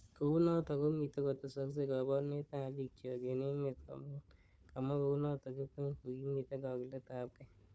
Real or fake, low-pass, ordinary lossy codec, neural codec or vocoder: fake; none; none; codec, 16 kHz, 4 kbps, FunCodec, trained on Chinese and English, 50 frames a second